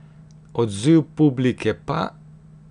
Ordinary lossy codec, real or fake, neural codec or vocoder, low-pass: none; real; none; 9.9 kHz